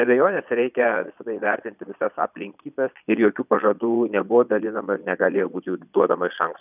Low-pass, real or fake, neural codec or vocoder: 3.6 kHz; fake; vocoder, 22.05 kHz, 80 mel bands, Vocos